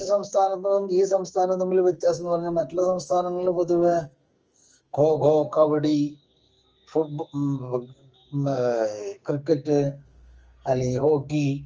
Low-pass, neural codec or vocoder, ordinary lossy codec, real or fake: 7.2 kHz; codec, 44.1 kHz, 2.6 kbps, SNAC; Opus, 24 kbps; fake